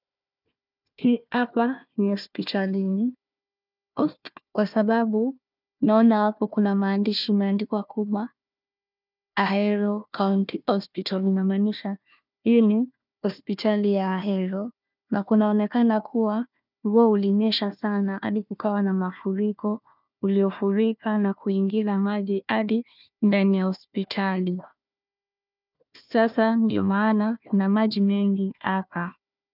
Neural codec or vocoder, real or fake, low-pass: codec, 16 kHz, 1 kbps, FunCodec, trained on Chinese and English, 50 frames a second; fake; 5.4 kHz